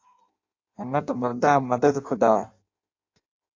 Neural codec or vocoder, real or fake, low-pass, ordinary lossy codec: codec, 16 kHz in and 24 kHz out, 0.6 kbps, FireRedTTS-2 codec; fake; 7.2 kHz; AAC, 48 kbps